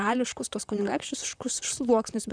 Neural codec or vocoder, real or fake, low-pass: vocoder, 44.1 kHz, 128 mel bands, Pupu-Vocoder; fake; 9.9 kHz